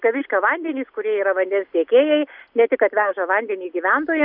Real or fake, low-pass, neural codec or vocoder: real; 5.4 kHz; none